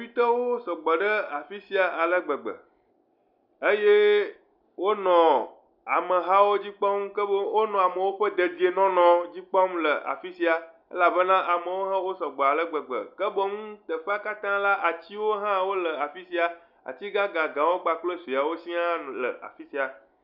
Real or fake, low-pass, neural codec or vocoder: real; 5.4 kHz; none